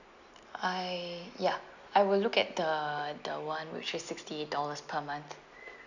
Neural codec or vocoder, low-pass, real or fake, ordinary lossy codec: none; 7.2 kHz; real; none